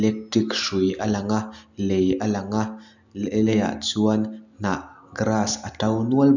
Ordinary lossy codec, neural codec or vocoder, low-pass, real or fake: none; none; 7.2 kHz; real